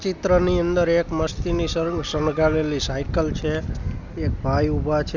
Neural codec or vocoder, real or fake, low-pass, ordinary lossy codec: none; real; 7.2 kHz; none